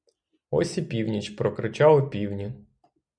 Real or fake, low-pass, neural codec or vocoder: real; 9.9 kHz; none